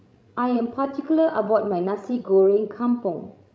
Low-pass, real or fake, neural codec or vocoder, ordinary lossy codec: none; fake; codec, 16 kHz, 16 kbps, FreqCodec, larger model; none